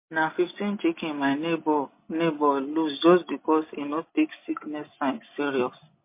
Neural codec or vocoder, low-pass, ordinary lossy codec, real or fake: none; 3.6 kHz; MP3, 24 kbps; real